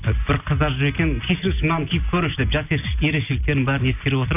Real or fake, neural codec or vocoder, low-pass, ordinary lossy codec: real; none; 3.6 kHz; none